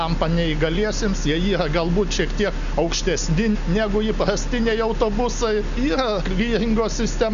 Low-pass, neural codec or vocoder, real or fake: 7.2 kHz; none; real